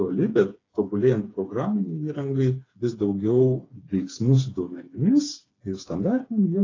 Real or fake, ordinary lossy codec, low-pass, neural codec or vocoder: fake; AAC, 32 kbps; 7.2 kHz; codec, 16 kHz, 4 kbps, FreqCodec, smaller model